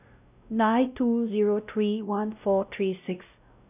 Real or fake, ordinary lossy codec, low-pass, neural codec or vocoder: fake; none; 3.6 kHz; codec, 16 kHz, 0.5 kbps, X-Codec, WavLM features, trained on Multilingual LibriSpeech